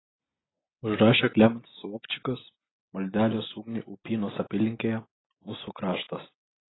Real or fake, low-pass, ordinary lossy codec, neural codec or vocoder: real; 7.2 kHz; AAC, 16 kbps; none